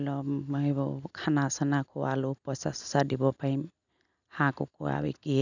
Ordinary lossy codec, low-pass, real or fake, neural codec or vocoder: none; 7.2 kHz; real; none